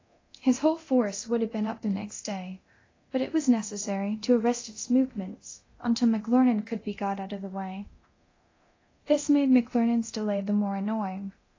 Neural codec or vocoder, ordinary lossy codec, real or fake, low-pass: codec, 24 kHz, 0.9 kbps, DualCodec; AAC, 32 kbps; fake; 7.2 kHz